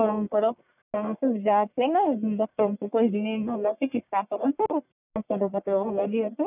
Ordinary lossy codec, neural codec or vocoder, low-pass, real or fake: none; codec, 44.1 kHz, 1.7 kbps, Pupu-Codec; 3.6 kHz; fake